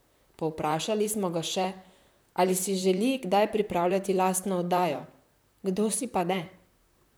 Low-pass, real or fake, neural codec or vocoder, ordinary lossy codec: none; fake; vocoder, 44.1 kHz, 128 mel bands, Pupu-Vocoder; none